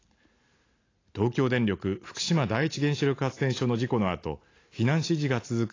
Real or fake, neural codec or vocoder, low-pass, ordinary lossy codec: real; none; 7.2 kHz; AAC, 32 kbps